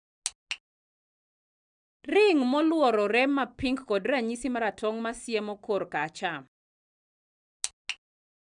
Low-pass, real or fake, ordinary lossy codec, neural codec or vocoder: 9.9 kHz; real; none; none